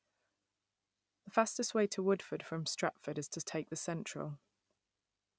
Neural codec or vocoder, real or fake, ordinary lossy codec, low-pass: none; real; none; none